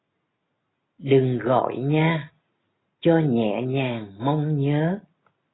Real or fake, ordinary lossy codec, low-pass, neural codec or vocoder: real; AAC, 16 kbps; 7.2 kHz; none